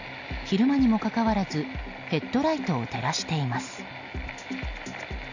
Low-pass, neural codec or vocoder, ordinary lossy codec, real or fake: 7.2 kHz; none; none; real